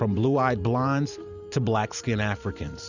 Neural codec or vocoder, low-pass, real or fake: vocoder, 44.1 kHz, 128 mel bands every 512 samples, BigVGAN v2; 7.2 kHz; fake